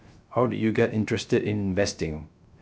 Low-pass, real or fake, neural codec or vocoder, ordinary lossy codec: none; fake; codec, 16 kHz, 0.3 kbps, FocalCodec; none